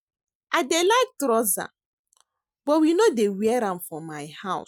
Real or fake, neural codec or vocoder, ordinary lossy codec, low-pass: real; none; none; 19.8 kHz